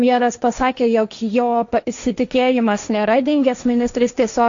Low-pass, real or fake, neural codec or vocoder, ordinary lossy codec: 7.2 kHz; fake; codec, 16 kHz, 1.1 kbps, Voila-Tokenizer; AAC, 64 kbps